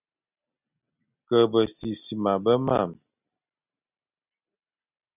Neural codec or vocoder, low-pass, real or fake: none; 3.6 kHz; real